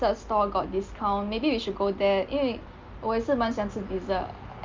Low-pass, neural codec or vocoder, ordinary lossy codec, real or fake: 7.2 kHz; none; Opus, 32 kbps; real